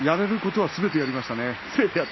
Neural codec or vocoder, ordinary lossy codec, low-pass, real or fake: none; MP3, 24 kbps; 7.2 kHz; real